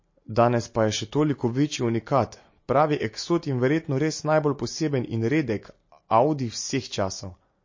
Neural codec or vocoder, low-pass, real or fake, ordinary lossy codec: none; 7.2 kHz; real; MP3, 32 kbps